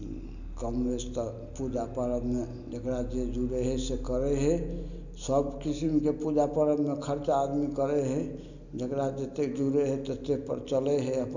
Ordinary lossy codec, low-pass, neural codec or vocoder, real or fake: none; 7.2 kHz; none; real